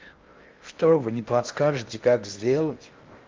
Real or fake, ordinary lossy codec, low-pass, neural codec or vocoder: fake; Opus, 32 kbps; 7.2 kHz; codec, 16 kHz in and 24 kHz out, 0.6 kbps, FocalCodec, streaming, 4096 codes